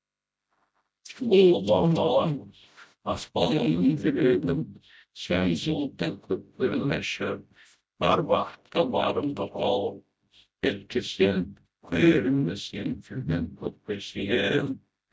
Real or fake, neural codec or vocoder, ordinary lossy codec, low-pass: fake; codec, 16 kHz, 0.5 kbps, FreqCodec, smaller model; none; none